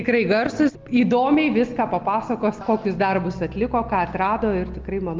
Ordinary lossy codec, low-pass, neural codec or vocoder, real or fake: Opus, 32 kbps; 7.2 kHz; none; real